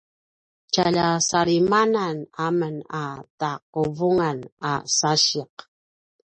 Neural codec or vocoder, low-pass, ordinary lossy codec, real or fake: none; 10.8 kHz; MP3, 32 kbps; real